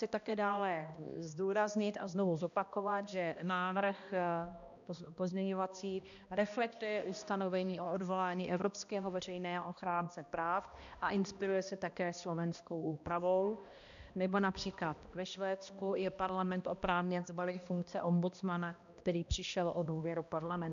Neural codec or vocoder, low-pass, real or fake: codec, 16 kHz, 1 kbps, X-Codec, HuBERT features, trained on balanced general audio; 7.2 kHz; fake